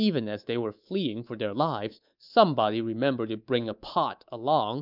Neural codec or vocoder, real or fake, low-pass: codec, 24 kHz, 3.1 kbps, DualCodec; fake; 5.4 kHz